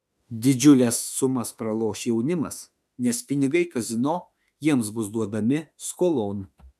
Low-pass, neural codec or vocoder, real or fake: 14.4 kHz; autoencoder, 48 kHz, 32 numbers a frame, DAC-VAE, trained on Japanese speech; fake